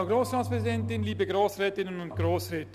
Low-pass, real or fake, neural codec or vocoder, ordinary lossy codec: 14.4 kHz; real; none; none